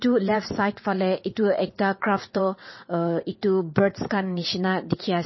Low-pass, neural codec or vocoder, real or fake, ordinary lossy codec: 7.2 kHz; vocoder, 22.05 kHz, 80 mel bands, WaveNeXt; fake; MP3, 24 kbps